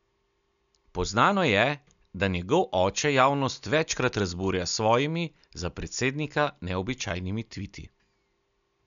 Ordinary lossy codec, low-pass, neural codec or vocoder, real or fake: none; 7.2 kHz; none; real